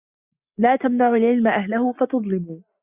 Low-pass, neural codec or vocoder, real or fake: 3.6 kHz; none; real